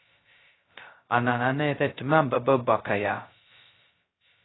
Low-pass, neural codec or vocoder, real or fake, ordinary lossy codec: 7.2 kHz; codec, 16 kHz, 0.2 kbps, FocalCodec; fake; AAC, 16 kbps